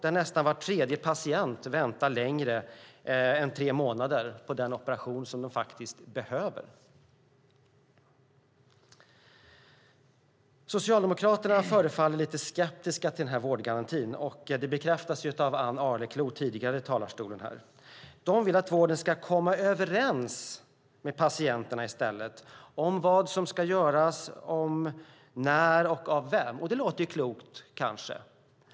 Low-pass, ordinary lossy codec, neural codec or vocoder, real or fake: none; none; none; real